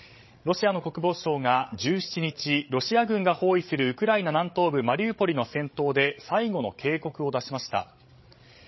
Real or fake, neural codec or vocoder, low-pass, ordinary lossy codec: fake; codec, 16 kHz, 16 kbps, FreqCodec, larger model; 7.2 kHz; MP3, 24 kbps